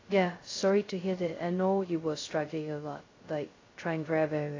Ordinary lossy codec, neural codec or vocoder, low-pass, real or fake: AAC, 32 kbps; codec, 16 kHz, 0.2 kbps, FocalCodec; 7.2 kHz; fake